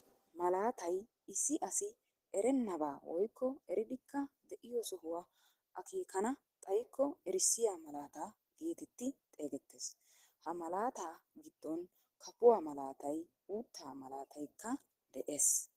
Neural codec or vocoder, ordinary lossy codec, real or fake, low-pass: none; Opus, 16 kbps; real; 14.4 kHz